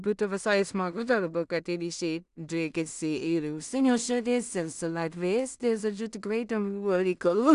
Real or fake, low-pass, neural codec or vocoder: fake; 10.8 kHz; codec, 16 kHz in and 24 kHz out, 0.4 kbps, LongCat-Audio-Codec, two codebook decoder